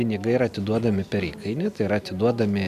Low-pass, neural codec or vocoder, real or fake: 14.4 kHz; none; real